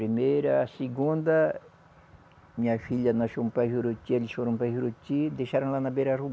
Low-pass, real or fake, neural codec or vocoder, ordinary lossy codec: none; real; none; none